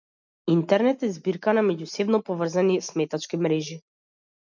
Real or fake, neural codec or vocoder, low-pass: real; none; 7.2 kHz